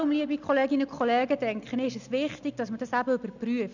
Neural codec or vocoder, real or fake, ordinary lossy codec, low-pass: none; real; none; 7.2 kHz